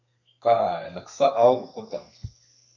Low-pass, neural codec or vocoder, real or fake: 7.2 kHz; codec, 32 kHz, 1.9 kbps, SNAC; fake